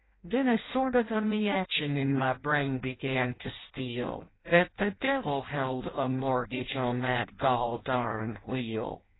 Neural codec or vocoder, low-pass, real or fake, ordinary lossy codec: codec, 16 kHz in and 24 kHz out, 0.6 kbps, FireRedTTS-2 codec; 7.2 kHz; fake; AAC, 16 kbps